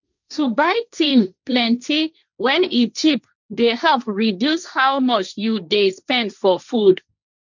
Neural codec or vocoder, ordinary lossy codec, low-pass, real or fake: codec, 16 kHz, 1.1 kbps, Voila-Tokenizer; none; 7.2 kHz; fake